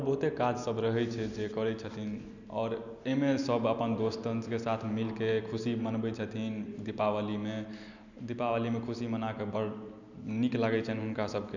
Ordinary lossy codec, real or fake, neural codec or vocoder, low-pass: none; real; none; 7.2 kHz